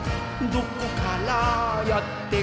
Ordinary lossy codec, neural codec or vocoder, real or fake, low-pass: none; none; real; none